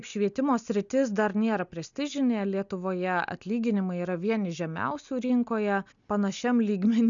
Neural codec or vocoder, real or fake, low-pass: none; real; 7.2 kHz